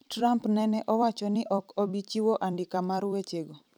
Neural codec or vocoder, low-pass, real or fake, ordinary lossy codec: vocoder, 44.1 kHz, 128 mel bands every 256 samples, BigVGAN v2; 19.8 kHz; fake; none